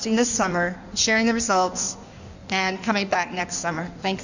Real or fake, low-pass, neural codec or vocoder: fake; 7.2 kHz; codec, 16 kHz in and 24 kHz out, 1.1 kbps, FireRedTTS-2 codec